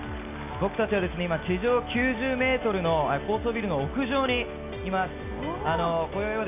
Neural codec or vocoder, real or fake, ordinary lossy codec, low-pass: none; real; none; 3.6 kHz